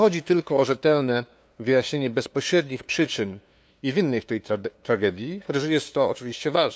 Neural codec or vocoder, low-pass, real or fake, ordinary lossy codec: codec, 16 kHz, 2 kbps, FunCodec, trained on LibriTTS, 25 frames a second; none; fake; none